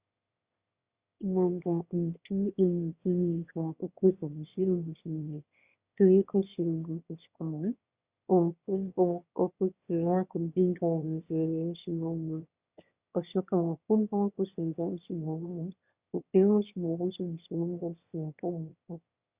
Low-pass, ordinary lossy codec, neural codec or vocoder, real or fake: 3.6 kHz; Opus, 64 kbps; autoencoder, 22.05 kHz, a latent of 192 numbers a frame, VITS, trained on one speaker; fake